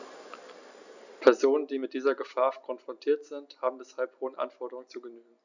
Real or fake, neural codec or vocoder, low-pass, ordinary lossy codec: real; none; 7.2 kHz; none